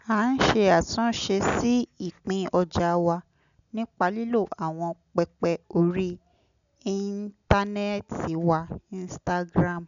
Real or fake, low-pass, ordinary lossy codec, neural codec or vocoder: real; 7.2 kHz; none; none